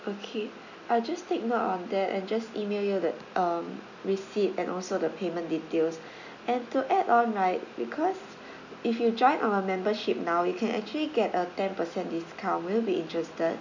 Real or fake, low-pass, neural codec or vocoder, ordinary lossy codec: real; 7.2 kHz; none; none